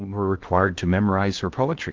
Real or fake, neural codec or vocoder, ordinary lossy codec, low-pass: fake; codec, 16 kHz in and 24 kHz out, 0.6 kbps, FocalCodec, streaming, 2048 codes; Opus, 16 kbps; 7.2 kHz